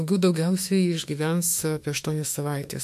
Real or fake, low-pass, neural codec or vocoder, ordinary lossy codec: fake; 14.4 kHz; autoencoder, 48 kHz, 32 numbers a frame, DAC-VAE, trained on Japanese speech; MP3, 96 kbps